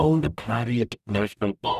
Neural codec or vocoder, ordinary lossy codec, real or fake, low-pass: codec, 44.1 kHz, 0.9 kbps, DAC; none; fake; 14.4 kHz